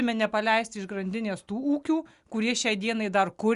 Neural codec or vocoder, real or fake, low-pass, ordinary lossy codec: none; real; 10.8 kHz; Opus, 64 kbps